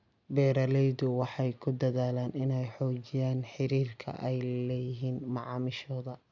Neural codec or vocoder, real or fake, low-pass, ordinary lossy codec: none; real; 7.2 kHz; none